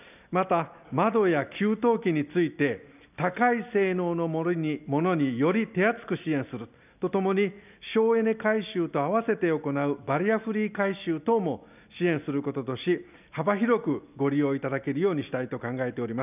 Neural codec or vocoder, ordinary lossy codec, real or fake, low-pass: none; none; real; 3.6 kHz